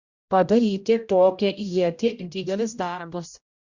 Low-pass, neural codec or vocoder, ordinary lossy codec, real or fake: 7.2 kHz; codec, 16 kHz, 0.5 kbps, X-Codec, HuBERT features, trained on general audio; Opus, 64 kbps; fake